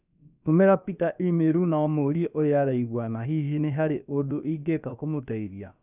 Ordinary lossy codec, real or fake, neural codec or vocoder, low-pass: none; fake; codec, 16 kHz, about 1 kbps, DyCAST, with the encoder's durations; 3.6 kHz